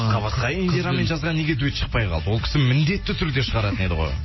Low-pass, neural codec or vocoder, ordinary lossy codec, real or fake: 7.2 kHz; none; MP3, 24 kbps; real